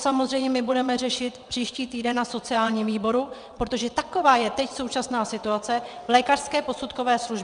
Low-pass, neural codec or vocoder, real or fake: 9.9 kHz; vocoder, 22.05 kHz, 80 mel bands, WaveNeXt; fake